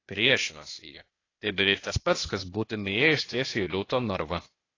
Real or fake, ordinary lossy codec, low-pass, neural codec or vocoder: fake; AAC, 32 kbps; 7.2 kHz; codec, 16 kHz, 0.8 kbps, ZipCodec